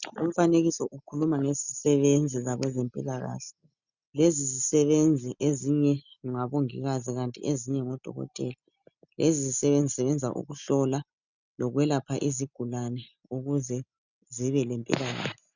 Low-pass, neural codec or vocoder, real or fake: 7.2 kHz; none; real